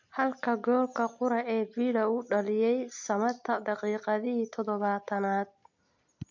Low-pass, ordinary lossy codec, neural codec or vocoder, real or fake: 7.2 kHz; MP3, 48 kbps; none; real